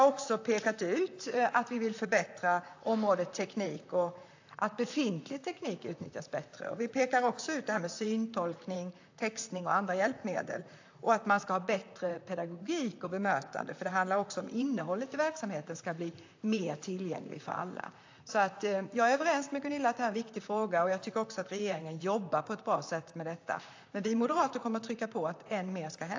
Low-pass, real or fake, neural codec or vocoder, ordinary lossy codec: 7.2 kHz; fake; vocoder, 44.1 kHz, 128 mel bands, Pupu-Vocoder; AAC, 48 kbps